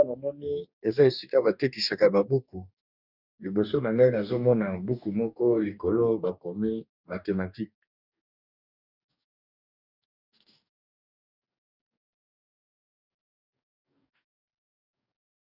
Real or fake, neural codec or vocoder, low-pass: fake; codec, 44.1 kHz, 2.6 kbps, DAC; 5.4 kHz